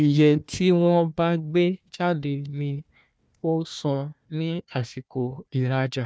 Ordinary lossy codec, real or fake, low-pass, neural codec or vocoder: none; fake; none; codec, 16 kHz, 1 kbps, FunCodec, trained on Chinese and English, 50 frames a second